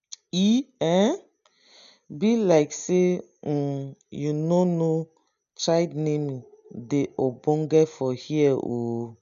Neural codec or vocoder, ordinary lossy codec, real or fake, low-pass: none; none; real; 7.2 kHz